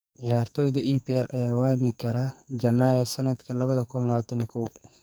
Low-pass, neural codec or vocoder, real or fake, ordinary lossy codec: none; codec, 44.1 kHz, 2.6 kbps, SNAC; fake; none